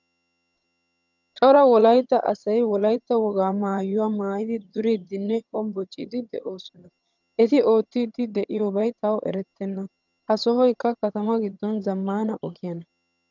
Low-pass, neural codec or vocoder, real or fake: 7.2 kHz; vocoder, 22.05 kHz, 80 mel bands, HiFi-GAN; fake